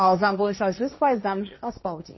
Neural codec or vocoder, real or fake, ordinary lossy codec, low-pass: codec, 16 kHz, 1.1 kbps, Voila-Tokenizer; fake; MP3, 24 kbps; 7.2 kHz